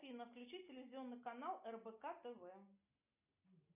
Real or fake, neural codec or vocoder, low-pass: real; none; 3.6 kHz